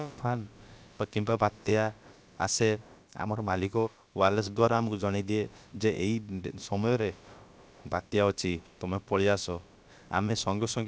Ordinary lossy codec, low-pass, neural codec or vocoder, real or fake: none; none; codec, 16 kHz, about 1 kbps, DyCAST, with the encoder's durations; fake